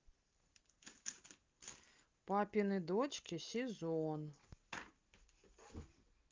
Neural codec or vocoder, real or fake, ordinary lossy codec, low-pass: none; real; Opus, 24 kbps; 7.2 kHz